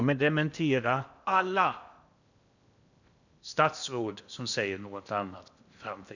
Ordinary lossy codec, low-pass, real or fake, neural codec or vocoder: none; 7.2 kHz; fake; codec, 16 kHz in and 24 kHz out, 0.8 kbps, FocalCodec, streaming, 65536 codes